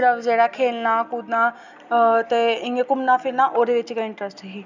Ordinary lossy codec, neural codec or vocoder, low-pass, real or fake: none; none; 7.2 kHz; real